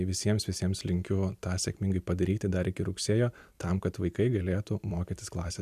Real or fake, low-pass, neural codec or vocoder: fake; 14.4 kHz; vocoder, 44.1 kHz, 128 mel bands every 256 samples, BigVGAN v2